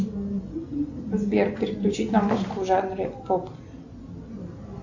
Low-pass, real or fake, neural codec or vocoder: 7.2 kHz; real; none